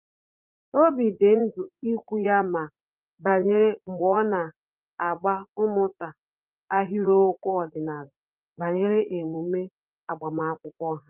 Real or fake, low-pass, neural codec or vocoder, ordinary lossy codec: fake; 3.6 kHz; vocoder, 44.1 kHz, 80 mel bands, Vocos; Opus, 24 kbps